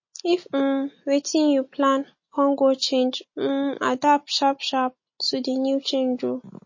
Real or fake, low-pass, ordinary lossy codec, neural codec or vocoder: real; 7.2 kHz; MP3, 32 kbps; none